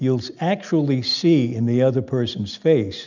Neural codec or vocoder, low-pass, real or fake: none; 7.2 kHz; real